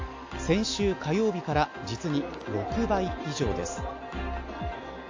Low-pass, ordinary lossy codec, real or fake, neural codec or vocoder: 7.2 kHz; AAC, 48 kbps; real; none